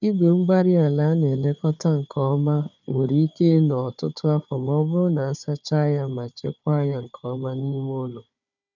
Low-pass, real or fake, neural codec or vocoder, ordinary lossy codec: 7.2 kHz; fake; codec, 16 kHz, 4 kbps, FunCodec, trained on Chinese and English, 50 frames a second; none